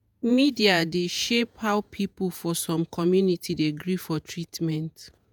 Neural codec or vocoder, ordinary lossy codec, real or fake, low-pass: vocoder, 48 kHz, 128 mel bands, Vocos; none; fake; none